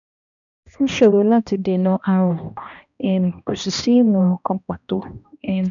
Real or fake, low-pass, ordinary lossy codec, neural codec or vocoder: fake; 7.2 kHz; none; codec, 16 kHz, 1 kbps, X-Codec, HuBERT features, trained on balanced general audio